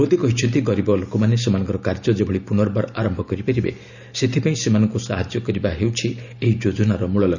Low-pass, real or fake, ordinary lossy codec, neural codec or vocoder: 7.2 kHz; real; none; none